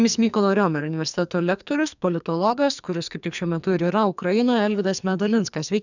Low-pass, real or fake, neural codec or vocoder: 7.2 kHz; fake; codec, 44.1 kHz, 2.6 kbps, SNAC